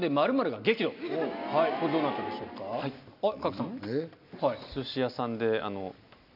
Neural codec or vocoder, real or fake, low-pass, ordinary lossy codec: none; real; 5.4 kHz; none